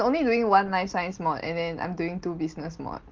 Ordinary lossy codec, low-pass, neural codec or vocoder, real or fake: Opus, 16 kbps; 7.2 kHz; none; real